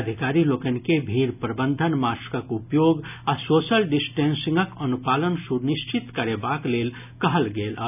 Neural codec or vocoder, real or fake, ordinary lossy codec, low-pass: none; real; none; 3.6 kHz